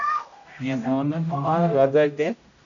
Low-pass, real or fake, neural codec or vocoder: 7.2 kHz; fake; codec, 16 kHz, 0.5 kbps, X-Codec, HuBERT features, trained on general audio